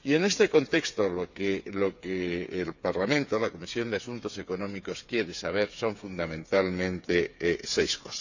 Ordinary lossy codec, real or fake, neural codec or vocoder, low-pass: none; fake; codec, 16 kHz, 8 kbps, FreqCodec, smaller model; 7.2 kHz